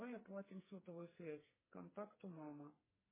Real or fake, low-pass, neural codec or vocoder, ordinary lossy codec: fake; 3.6 kHz; codec, 44.1 kHz, 2.6 kbps, SNAC; MP3, 16 kbps